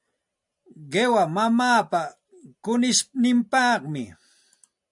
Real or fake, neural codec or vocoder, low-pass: real; none; 10.8 kHz